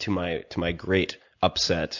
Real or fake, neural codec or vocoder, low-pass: real; none; 7.2 kHz